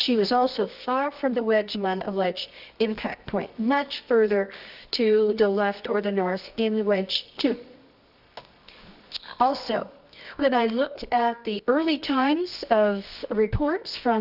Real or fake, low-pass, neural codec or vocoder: fake; 5.4 kHz; codec, 24 kHz, 0.9 kbps, WavTokenizer, medium music audio release